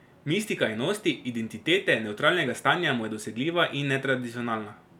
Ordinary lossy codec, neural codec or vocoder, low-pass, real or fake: none; none; 19.8 kHz; real